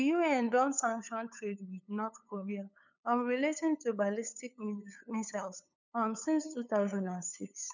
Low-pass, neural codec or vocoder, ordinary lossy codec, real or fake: 7.2 kHz; codec, 16 kHz, 8 kbps, FunCodec, trained on LibriTTS, 25 frames a second; none; fake